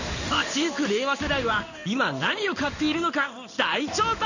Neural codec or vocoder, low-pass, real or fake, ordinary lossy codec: codec, 16 kHz in and 24 kHz out, 1 kbps, XY-Tokenizer; 7.2 kHz; fake; none